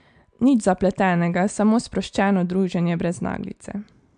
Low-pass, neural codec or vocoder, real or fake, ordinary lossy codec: 9.9 kHz; none; real; MP3, 64 kbps